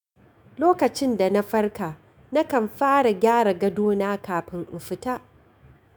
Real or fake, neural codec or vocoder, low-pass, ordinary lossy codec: fake; autoencoder, 48 kHz, 128 numbers a frame, DAC-VAE, trained on Japanese speech; none; none